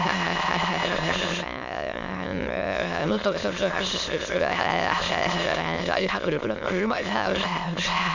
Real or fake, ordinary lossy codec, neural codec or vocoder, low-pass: fake; none; autoencoder, 22.05 kHz, a latent of 192 numbers a frame, VITS, trained on many speakers; 7.2 kHz